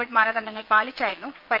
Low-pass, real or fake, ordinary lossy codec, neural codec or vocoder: 5.4 kHz; fake; Opus, 32 kbps; vocoder, 22.05 kHz, 80 mel bands, Vocos